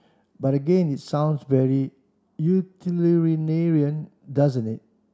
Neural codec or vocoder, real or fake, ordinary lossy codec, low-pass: none; real; none; none